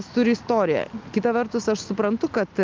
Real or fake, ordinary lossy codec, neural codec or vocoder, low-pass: real; Opus, 24 kbps; none; 7.2 kHz